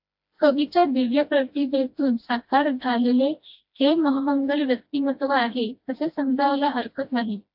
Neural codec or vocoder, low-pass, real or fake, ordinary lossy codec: codec, 16 kHz, 1 kbps, FreqCodec, smaller model; 5.4 kHz; fake; AAC, 48 kbps